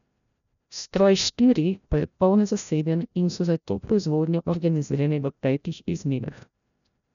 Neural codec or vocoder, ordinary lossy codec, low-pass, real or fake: codec, 16 kHz, 0.5 kbps, FreqCodec, larger model; none; 7.2 kHz; fake